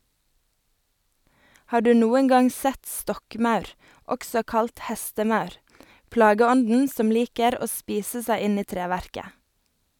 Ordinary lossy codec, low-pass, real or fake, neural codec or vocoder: none; 19.8 kHz; real; none